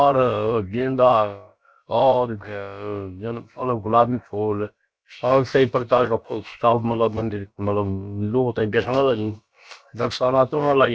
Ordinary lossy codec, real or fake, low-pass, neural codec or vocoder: none; fake; none; codec, 16 kHz, about 1 kbps, DyCAST, with the encoder's durations